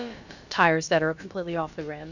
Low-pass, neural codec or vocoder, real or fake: 7.2 kHz; codec, 16 kHz, about 1 kbps, DyCAST, with the encoder's durations; fake